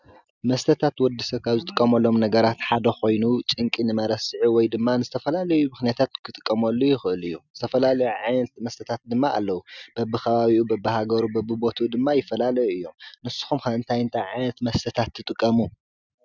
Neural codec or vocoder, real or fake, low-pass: none; real; 7.2 kHz